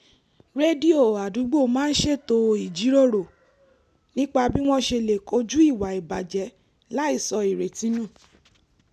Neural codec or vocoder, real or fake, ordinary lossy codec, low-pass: none; real; none; 14.4 kHz